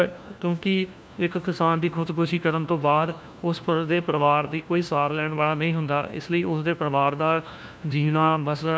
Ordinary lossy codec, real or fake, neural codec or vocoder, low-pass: none; fake; codec, 16 kHz, 1 kbps, FunCodec, trained on LibriTTS, 50 frames a second; none